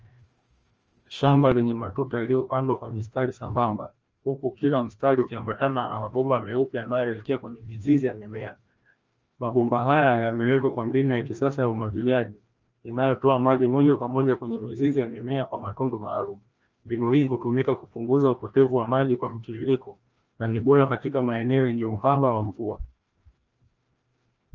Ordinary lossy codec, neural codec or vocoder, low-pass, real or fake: Opus, 24 kbps; codec, 16 kHz, 1 kbps, FreqCodec, larger model; 7.2 kHz; fake